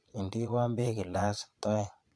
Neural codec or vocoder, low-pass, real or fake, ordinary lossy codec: vocoder, 22.05 kHz, 80 mel bands, Vocos; none; fake; none